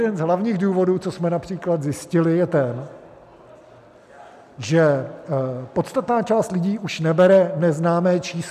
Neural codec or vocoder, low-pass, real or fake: none; 14.4 kHz; real